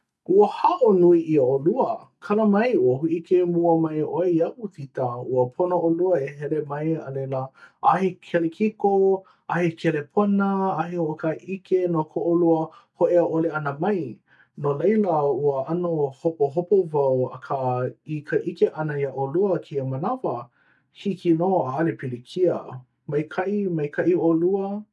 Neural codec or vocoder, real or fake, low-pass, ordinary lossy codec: none; real; none; none